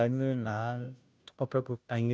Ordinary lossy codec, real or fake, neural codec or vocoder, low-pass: none; fake; codec, 16 kHz, 0.5 kbps, FunCodec, trained on Chinese and English, 25 frames a second; none